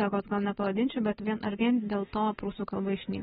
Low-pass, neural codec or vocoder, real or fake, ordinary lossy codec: 19.8 kHz; none; real; AAC, 16 kbps